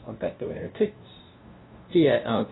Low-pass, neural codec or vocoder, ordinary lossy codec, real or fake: 7.2 kHz; codec, 16 kHz, 0.5 kbps, FunCodec, trained on LibriTTS, 25 frames a second; AAC, 16 kbps; fake